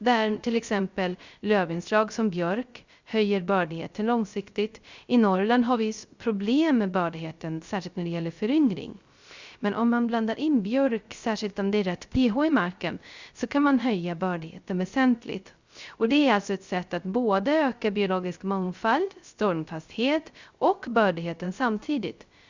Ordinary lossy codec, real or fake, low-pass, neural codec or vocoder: Opus, 64 kbps; fake; 7.2 kHz; codec, 16 kHz, 0.3 kbps, FocalCodec